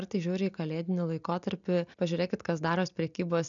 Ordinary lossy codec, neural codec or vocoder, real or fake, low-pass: MP3, 96 kbps; none; real; 7.2 kHz